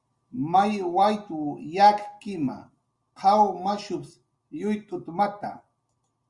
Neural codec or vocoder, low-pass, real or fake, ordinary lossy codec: none; 10.8 kHz; real; Opus, 64 kbps